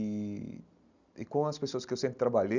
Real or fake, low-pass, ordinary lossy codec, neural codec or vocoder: real; 7.2 kHz; none; none